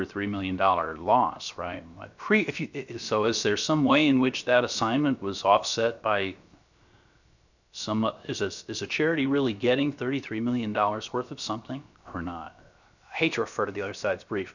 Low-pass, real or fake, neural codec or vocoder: 7.2 kHz; fake; codec, 16 kHz, 0.7 kbps, FocalCodec